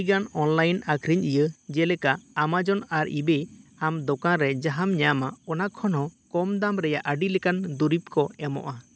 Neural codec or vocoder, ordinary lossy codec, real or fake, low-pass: none; none; real; none